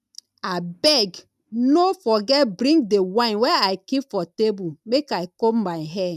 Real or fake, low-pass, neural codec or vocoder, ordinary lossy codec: real; 14.4 kHz; none; none